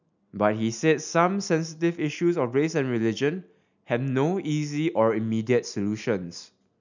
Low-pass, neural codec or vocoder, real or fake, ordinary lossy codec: 7.2 kHz; none; real; none